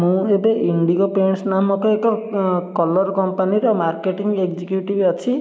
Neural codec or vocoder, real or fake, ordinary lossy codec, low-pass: none; real; none; none